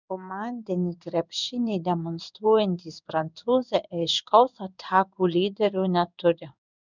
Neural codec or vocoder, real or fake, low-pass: codec, 16 kHz in and 24 kHz out, 1 kbps, XY-Tokenizer; fake; 7.2 kHz